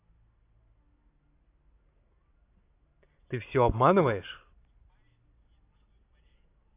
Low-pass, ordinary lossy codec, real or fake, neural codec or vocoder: 3.6 kHz; AAC, 24 kbps; real; none